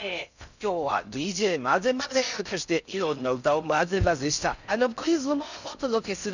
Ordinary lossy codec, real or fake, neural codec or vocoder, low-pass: none; fake; codec, 16 kHz in and 24 kHz out, 0.6 kbps, FocalCodec, streaming, 4096 codes; 7.2 kHz